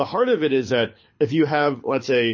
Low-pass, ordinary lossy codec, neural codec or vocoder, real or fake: 7.2 kHz; MP3, 32 kbps; codec, 24 kHz, 6 kbps, HILCodec; fake